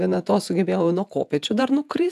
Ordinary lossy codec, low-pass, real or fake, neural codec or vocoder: AAC, 96 kbps; 14.4 kHz; fake; vocoder, 48 kHz, 128 mel bands, Vocos